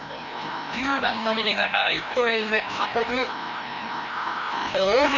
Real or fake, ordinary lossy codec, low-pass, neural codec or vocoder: fake; none; 7.2 kHz; codec, 16 kHz, 1 kbps, FreqCodec, larger model